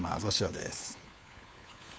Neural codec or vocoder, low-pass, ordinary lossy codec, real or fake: codec, 16 kHz, 4 kbps, FunCodec, trained on LibriTTS, 50 frames a second; none; none; fake